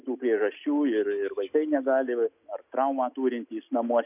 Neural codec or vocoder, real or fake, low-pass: none; real; 3.6 kHz